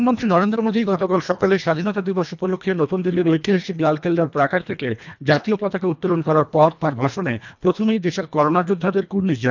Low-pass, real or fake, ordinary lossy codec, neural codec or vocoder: 7.2 kHz; fake; none; codec, 24 kHz, 1.5 kbps, HILCodec